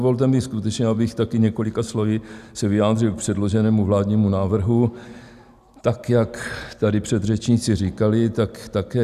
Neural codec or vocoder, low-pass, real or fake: none; 14.4 kHz; real